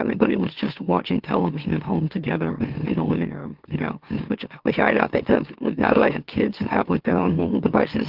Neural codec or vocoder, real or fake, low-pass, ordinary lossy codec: autoencoder, 44.1 kHz, a latent of 192 numbers a frame, MeloTTS; fake; 5.4 kHz; Opus, 16 kbps